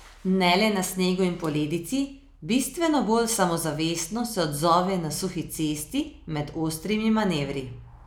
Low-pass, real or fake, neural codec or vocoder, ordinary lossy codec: none; real; none; none